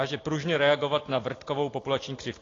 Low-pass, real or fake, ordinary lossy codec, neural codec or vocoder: 7.2 kHz; real; AAC, 32 kbps; none